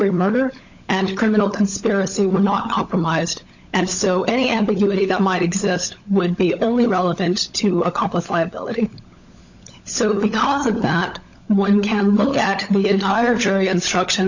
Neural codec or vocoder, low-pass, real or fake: codec, 16 kHz, 16 kbps, FunCodec, trained on LibriTTS, 50 frames a second; 7.2 kHz; fake